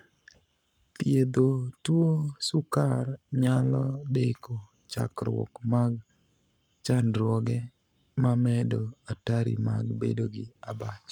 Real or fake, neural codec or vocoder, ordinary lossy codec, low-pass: fake; codec, 44.1 kHz, 7.8 kbps, Pupu-Codec; none; 19.8 kHz